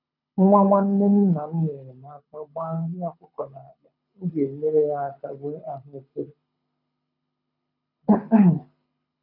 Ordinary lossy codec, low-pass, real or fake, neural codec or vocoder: none; 5.4 kHz; fake; codec, 24 kHz, 6 kbps, HILCodec